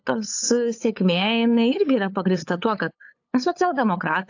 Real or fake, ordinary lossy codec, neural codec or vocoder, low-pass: fake; AAC, 48 kbps; codec, 16 kHz, 8 kbps, FunCodec, trained on LibriTTS, 25 frames a second; 7.2 kHz